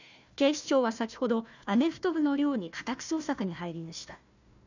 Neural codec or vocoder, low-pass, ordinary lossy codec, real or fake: codec, 16 kHz, 1 kbps, FunCodec, trained on Chinese and English, 50 frames a second; 7.2 kHz; none; fake